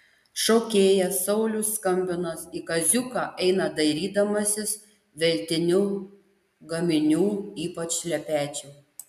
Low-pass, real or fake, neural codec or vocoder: 14.4 kHz; real; none